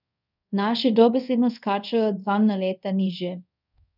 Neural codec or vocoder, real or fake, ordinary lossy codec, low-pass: codec, 24 kHz, 0.5 kbps, DualCodec; fake; none; 5.4 kHz